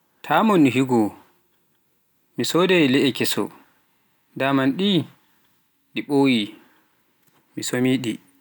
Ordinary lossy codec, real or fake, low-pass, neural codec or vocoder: none; real; none; none